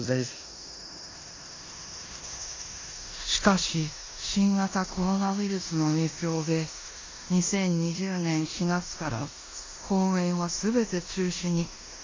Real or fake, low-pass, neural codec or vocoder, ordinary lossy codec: fake; 7.2 kHz; codec, 16 kHz in and 24 kHz out, 0.9 kbps, LongCat-Audio-Codec, four codebook decoder; AAC, 32 kbps